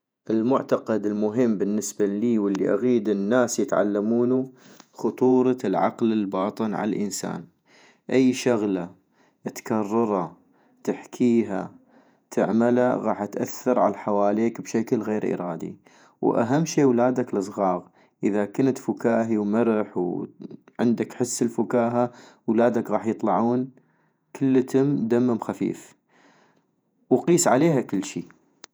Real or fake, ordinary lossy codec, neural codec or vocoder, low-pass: fake; none; vocoder, 48 kHz, 128 mel bands, Vocos; none